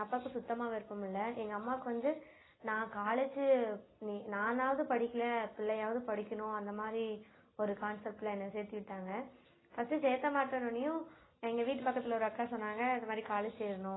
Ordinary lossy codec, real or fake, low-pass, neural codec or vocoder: AAC, 16 kbps; real; 7.2 kHz; none